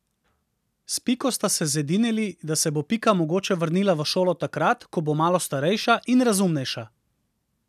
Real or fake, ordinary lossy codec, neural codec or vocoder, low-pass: real; none; none; 14.4 kHz